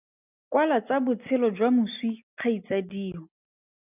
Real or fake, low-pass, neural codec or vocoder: real; 3.6 kHz; none